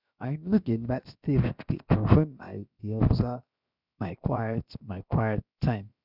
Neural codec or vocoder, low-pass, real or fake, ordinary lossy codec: codec, 16 kHz, 0.7 kbps, FocalCodec; 5.4 kHz; fake; none